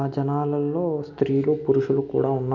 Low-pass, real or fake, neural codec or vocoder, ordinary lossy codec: 7.2 kHz; real; none; MP3, 64 kbps